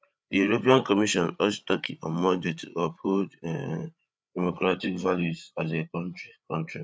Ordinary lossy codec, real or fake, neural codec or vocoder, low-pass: none; fake; codec, 16 kHz, 8 kbps, FreqCodec, larger model; none